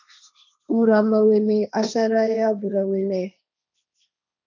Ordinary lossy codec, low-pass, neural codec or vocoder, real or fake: MP3, 64 kbps; 7.2 kHz; codec, 16 kHz, 1.1 kbps, Voila-Tokenizer; fake